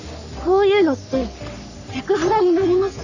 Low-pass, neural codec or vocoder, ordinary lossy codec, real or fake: 7.2 kHz; codec, 44.1 kHz, 3.4 kbps, Pupu-Codec; none; fake